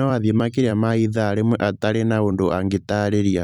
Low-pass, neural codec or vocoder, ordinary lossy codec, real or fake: 19.8 kHz; vocoder, 44.1 kHz, 128 mel bands every 256 samples, BigVGAN v2; none; fake